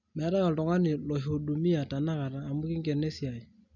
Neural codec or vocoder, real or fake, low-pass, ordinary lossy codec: none; real; 7.2 kHz; none